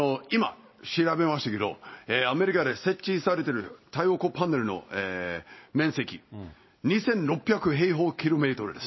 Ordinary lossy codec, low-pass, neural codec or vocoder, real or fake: MP3, 24 kbps; 7.2 kHz; none; real